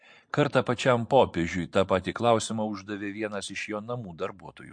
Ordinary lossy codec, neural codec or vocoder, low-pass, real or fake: MP3, 48 kbps; vocoder, 44.1 kHz, 128 mel bands every 512 samples, BigVGAN v2; 9.9 kHz; fake